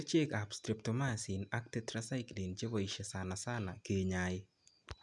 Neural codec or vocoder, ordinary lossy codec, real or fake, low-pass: none; MP3, 96 kbps; real; 10.8 kHz